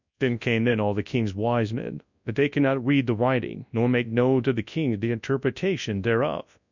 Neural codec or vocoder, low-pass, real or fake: codec, 24 kHz, 0.9 kbps, WavTokenizer, large speech release; 7.2 kHz; fake